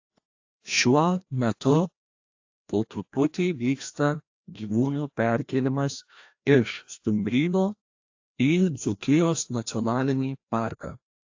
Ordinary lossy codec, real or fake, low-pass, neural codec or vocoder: AAC, 48 kbps; fake; 7.2 kHz; codec, 16 kHz, 1 kbps, FreqCodec, larger model